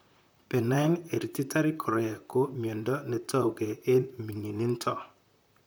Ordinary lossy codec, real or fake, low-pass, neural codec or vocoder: none; fake; none; vocoder, 44.1 kHz, 128 mel bands, Pupu-Vocoder